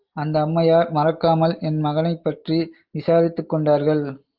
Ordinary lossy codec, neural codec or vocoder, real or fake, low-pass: Opus, 32 kbps; none; real; 5.4 kHz